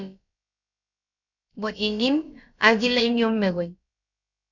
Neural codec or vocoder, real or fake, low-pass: codec, 16 kHz, about 1 kbps, DyCAST, with the encoder's durations; fake; 7.2 kHz